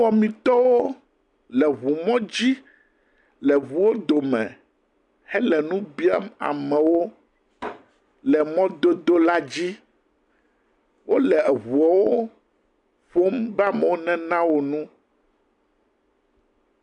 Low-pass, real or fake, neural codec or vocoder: 10.8 kHz; real; none